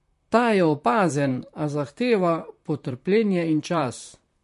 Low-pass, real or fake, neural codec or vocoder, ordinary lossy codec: 14.4 kHz; fake; vocoder, 44.1 kHz, 128 mel bands, Pupu-Vocoder; MP3, 48 kbps